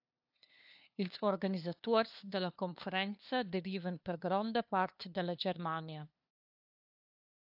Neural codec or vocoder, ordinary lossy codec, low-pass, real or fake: codec, 16 kHz, 2 kbps, FunCodec, trained on LibriTTS, 25 frames a second; AAC, 48 kbps; 5.4 kHz; fake